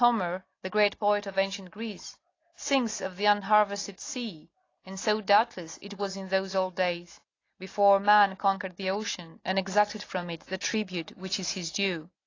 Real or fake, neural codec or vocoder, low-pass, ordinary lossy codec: real; none; 7.2 kHz; AAC, 32 kbps